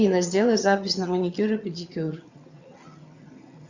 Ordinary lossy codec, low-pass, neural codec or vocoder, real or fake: Opus, 64 kbps; 7.2 kHz; vocoder, 22.05 kHz, 80 mel bands, HiFi-GAN; fake